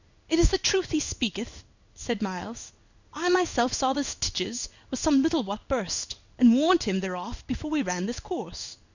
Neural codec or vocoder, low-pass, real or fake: codec, 16 kHz in and 24 kHz out, 1 kbps, XY-Tokenizer; 7.2 kHz; fake